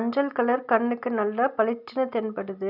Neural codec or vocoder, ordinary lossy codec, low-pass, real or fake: none; none; 5.4 kHz; real